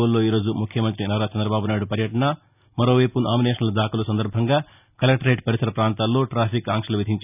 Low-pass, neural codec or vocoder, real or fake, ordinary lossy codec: 3.6 kHz; none; real; none